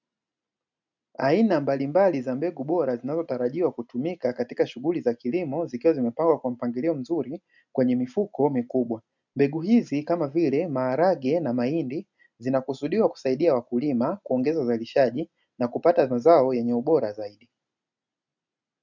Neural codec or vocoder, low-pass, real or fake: none; 7.2 kHz; real